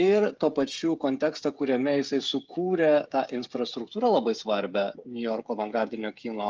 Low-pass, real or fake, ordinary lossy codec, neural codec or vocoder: 7.2 kHz; fake; Opus, 24 kbps; codec, 16 kHz, 8 kbps, FreqCodec, smaller model